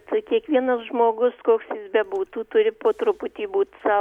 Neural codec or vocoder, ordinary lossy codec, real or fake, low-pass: none; MP3, 96 kbps; real; 19.8 kHz